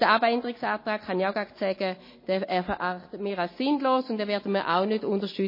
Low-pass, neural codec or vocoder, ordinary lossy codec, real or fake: 5.4 kHz; none; MP3, 24 kbps; real